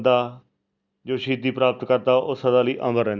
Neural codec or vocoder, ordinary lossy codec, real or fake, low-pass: none; Opus, 64 kbps; real; 7.2 kHz